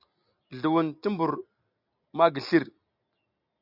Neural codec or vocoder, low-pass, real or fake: none; 5.4 kHz; real